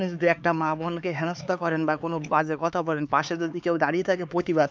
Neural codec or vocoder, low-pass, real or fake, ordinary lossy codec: codec, 16 kHz, 4 kbps, X-Codec, HuBERT features, trained on LibriSpeech; none; fake; none